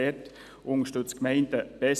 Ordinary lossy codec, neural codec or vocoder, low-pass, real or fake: none; none; 14.4 kHz; real